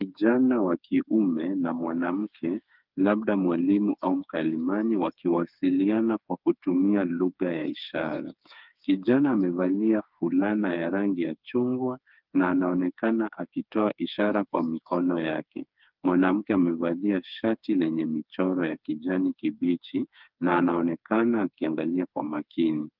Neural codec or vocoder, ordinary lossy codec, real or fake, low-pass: codec, 16 kHz, 4 kbps, FreqCodec, smaller model; Opus, 32 kbps; fake; 5.4 kHz